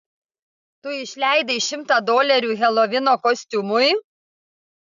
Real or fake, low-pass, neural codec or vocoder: real; 7.2 kHz; none